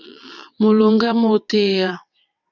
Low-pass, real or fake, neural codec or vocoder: 7.2 kHz; fake; vocoder, 22.05 kHz, 80 mel bands, WaveNeXt